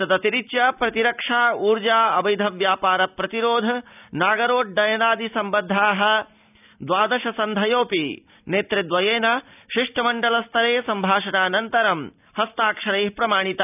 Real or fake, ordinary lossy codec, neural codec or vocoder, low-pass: real; none; none; 3.6 kHz